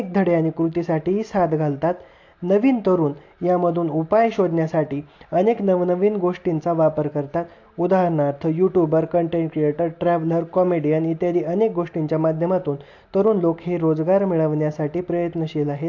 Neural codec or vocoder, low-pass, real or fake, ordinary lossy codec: none; 7.2 kHz; real; AAC, 48 kbps